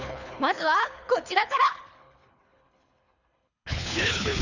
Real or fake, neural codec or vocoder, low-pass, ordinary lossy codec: fake; codec, 24 kHz, 3 kbps, HILCodec; 7.2 kHz; none